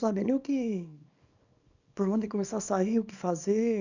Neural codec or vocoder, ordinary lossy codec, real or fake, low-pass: codec, 24 kHz, 0.9 kbps, WavTokenizer, small release; none; fake; 7.2 kHz